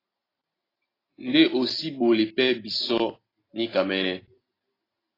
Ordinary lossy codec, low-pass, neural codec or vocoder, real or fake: AAC, 24 kbps; 5.4 kHz; none; real